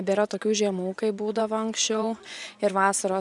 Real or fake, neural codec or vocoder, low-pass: fake; vocoder, 24 kHz, 100 mel bands, Vocos; 10.8 kHz